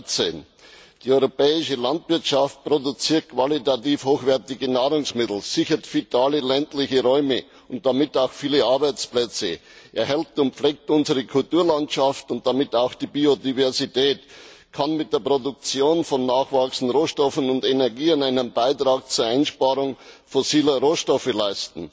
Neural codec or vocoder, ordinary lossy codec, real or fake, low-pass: none; none; real; none